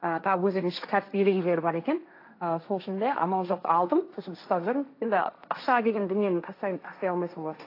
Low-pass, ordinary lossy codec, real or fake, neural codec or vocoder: 5.4 kHz; AAC, 32 kbps; fake; codec, 16 kHz, 1.1 kbps, Voila-Tokenizer